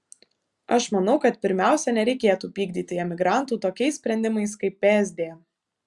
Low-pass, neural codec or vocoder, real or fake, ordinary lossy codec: 10.8 kHz; none; real; Opus, 64 kbps